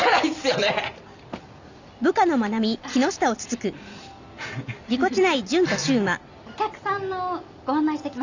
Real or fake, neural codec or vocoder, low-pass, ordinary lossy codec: real; none; 7.2 kHz; Opus, 64 kbps